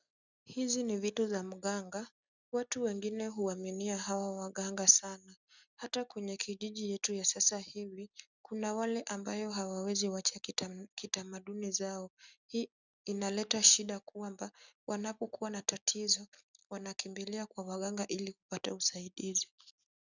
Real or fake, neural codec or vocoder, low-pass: real; none; 7.2 kHz